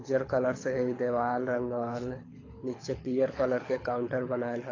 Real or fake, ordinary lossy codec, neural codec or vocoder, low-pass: fake; AAC, 32 kbps; codec, 24 kHz, 6 kbps, HILCodec; 7.2 kHz